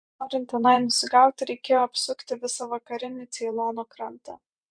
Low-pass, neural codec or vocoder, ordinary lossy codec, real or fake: 9.9 kHz; vocoder, 24 kHz, 100 mel bands, Vocos; MP3, 64 kbps; fake